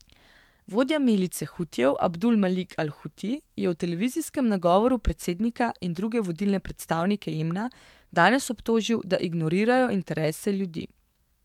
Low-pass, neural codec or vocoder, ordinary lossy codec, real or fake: 19.8 kHz; codec, 44.1 kHz, 7.8 kbps, DAC; MP3, 96 kbps; fake